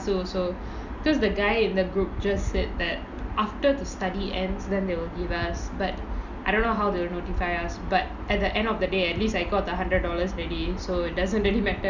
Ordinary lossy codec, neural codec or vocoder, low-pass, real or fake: none; none; 7.2 kHz; real